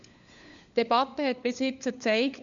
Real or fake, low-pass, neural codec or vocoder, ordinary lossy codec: fake; 7.2 kHz; codec, 16 kHz, 4 kbps, FunCodec, trained on LibriTTS, 50 frames a second; none